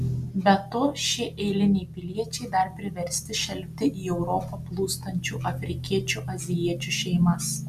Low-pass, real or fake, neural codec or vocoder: 14.4 kHz; real; none